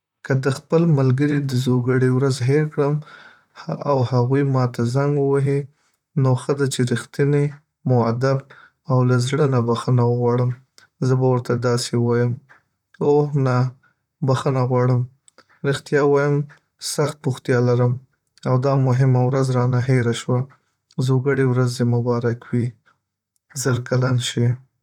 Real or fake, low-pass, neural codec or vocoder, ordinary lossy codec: fake; 19.8 kHz; vocoder, 44.1 kHz, 128 mel bands, Pupu-Vocoder; none